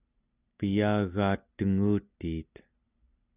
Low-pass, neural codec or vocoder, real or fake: 3.6 kHz; none; real